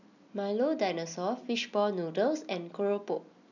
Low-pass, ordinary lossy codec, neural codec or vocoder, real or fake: 7.2 kHz; none; none; real